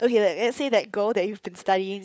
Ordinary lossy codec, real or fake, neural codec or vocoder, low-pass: none; fake; codec, 16 kHz, 16 kbps, FunCodec, trained on LibriTTS, 50 frames a second; none